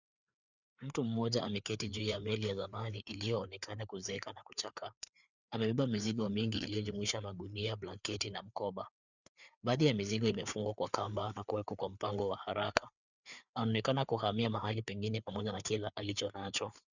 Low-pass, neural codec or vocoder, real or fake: 7.2 kHz; codec, 16 kHz, 4 kbps, FreqCodec, larger model; fake